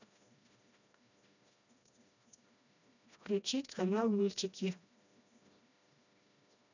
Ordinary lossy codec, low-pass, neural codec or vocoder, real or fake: none; 7.2 kHz; codec, 16 kHz, 1 kbps, FreqCodec, smaller model; fake